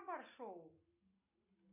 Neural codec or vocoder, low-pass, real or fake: none; 3.6 kHz; real